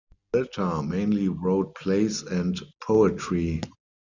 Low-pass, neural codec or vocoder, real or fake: 7.2 kHz; none; real